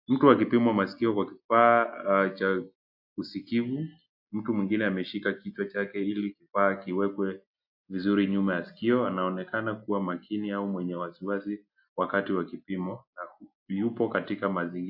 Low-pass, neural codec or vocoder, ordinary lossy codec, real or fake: 5.4 kHz; none; AAC, 48 kbps; real